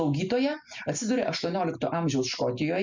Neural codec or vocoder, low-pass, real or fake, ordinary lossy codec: none; 7.2 kHz; real; MP3, 64 kbps